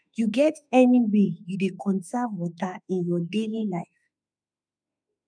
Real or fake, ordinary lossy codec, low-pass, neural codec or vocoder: fake; none; 9.9 kHz; autoencoder, 48 kHz, 32 numbers a frame, DAC-VAE, trained on Japanese speech